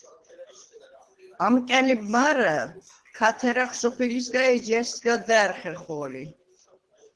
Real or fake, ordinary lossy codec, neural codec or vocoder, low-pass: fake; Opus, 16 kbps; codec, 24 kHz, 3 kbps, HILCodec; 10.8 kHz